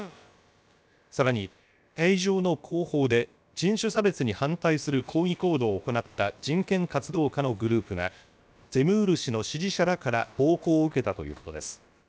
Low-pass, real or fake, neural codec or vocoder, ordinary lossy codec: none; fake; codec, 16 kHz, about 1 kbps, DyCAST, with the encoder's durations; none